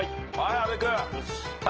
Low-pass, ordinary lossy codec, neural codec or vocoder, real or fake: 7.2 kHz; Opus, 16 kbps; none; real